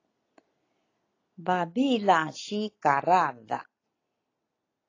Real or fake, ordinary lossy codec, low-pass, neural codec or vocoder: real; AAC, 32 kbps; 7.2 kHz; none